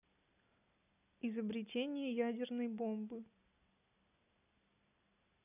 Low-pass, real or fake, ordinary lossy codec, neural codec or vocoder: 3.6 kHz; real; none; none